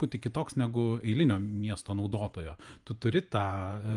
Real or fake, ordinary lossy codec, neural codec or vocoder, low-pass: fake; Opus, 32 kbps; vocoder, 24 kHz, 100 mel bands, Vocos; 10.8 kHz